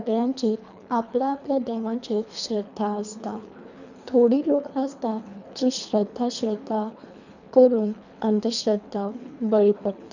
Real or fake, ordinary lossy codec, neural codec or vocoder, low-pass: fake; none; codec, 24 kHz, 3 kbps, HILCodec; 7.2 kHz